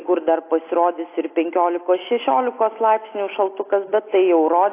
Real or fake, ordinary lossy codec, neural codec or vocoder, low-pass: real; AAC, 24 kbps; none; 3.6 kHz